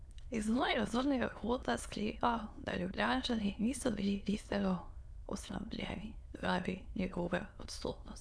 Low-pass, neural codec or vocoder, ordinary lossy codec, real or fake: none; autoencoder, 22.05 kHz, a latent of 192 numbers a frame, VITS, trained on many speakers; none; fake